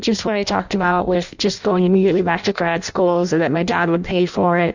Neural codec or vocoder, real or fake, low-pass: codec, 16 kHz in and 24 kHz out, 0.6 kbps, FireRedTTS-2 codec; fake; 7.2 kHz